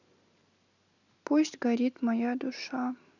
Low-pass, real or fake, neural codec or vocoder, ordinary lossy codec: 7.2 kHz; real; none; none